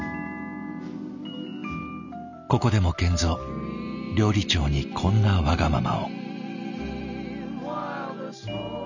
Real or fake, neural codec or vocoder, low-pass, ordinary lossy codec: real; none; 7.2 kHz; none